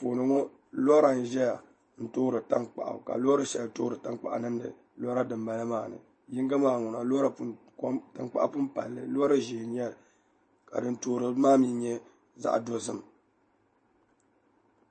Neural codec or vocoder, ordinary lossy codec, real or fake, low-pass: none; MP3, 32 kbps; real; 9.9 kHz